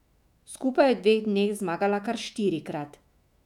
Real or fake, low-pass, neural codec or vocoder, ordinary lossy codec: fake; 19.8 kHz; autoencoder, 48 kHz, 128 numbers a frame, DAC-VAE, trained on Japanese speech; none